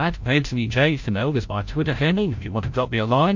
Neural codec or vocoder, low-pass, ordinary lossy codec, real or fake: codec, 16 kHz, 0.5 kbps, FreqCodec, larger model; 7.2 kHz; MP3, 48 kbps; fake